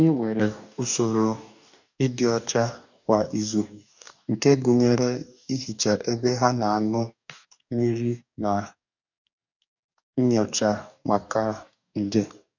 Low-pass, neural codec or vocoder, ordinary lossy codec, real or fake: 7.2 kHz; codec, 44.1 kHz, 2.6 kbps, DAC; none; fake